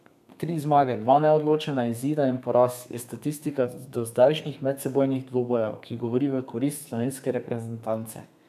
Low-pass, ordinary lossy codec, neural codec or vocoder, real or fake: 14.4 kHz; none; codec, 32 kHz, 1.9 kbps, SNAC; fake